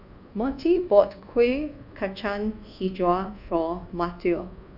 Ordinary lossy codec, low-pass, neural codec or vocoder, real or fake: none; 5.4 kHz; codec, 24 kHz, 1.2 kbps, DualCodec; fake